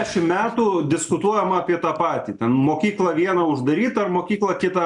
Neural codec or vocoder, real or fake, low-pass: none; real; 10.8 kHz